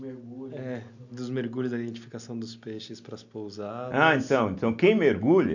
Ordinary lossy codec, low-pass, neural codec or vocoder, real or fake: none; 7.2 kHz; none; real